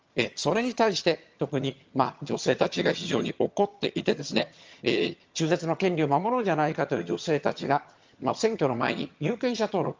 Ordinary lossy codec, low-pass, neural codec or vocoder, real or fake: Opus, 24 kbps; 7.2 kHz; vocoder, 22.05 kHz, 80 mel bands, HiFi-GAN; fake